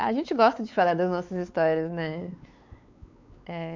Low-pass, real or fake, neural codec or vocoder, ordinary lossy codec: 7.2 kHz; fake; codec, 16 kHz, 4 kbps, X-Codec, WavLM features, trained on Multilingual LibriSpeech; MP3, 64 kbps